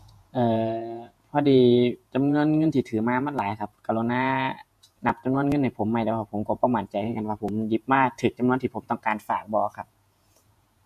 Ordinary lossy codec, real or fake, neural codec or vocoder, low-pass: MP3, 64 kbps; fake; vocoder, 48 kHz, 128 mel bands, Vocos; 14.4 kHz